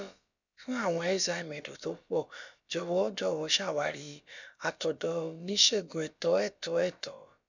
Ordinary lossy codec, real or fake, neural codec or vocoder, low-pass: none; fake; codec, 16 kHz, about 1 kbps, DyCAST, with the encoder's durations; 7.2 kHz